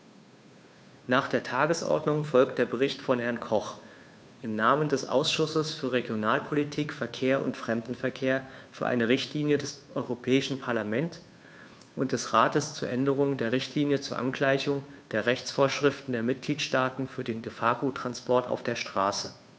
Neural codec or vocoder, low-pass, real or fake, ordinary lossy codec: codec, 16 kHz, 2 kbps, FunCodec, trained on Chinese and English, 25 frames a second; none; fake; none